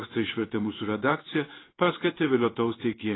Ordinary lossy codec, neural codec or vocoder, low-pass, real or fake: AAC, 16 kbps; codec, 16 kHz in and 24 kHz out, 1 kbps, XY-Tokenizer; 7.2 kHz; fake